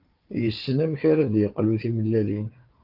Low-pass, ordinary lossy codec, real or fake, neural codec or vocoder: 5.4 kHz; Opus, 32 kbps; fake; codec, 16 kHz, 4 kbps, FunCodec, trained on Chinese and English, 50 frames a second